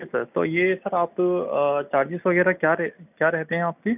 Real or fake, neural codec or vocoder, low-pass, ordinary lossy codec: real; none; 3.6 kHz; none